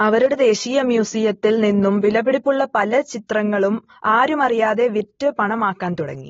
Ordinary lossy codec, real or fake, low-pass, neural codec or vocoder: AAC, 24 kbps; real; 7.2 kHz; none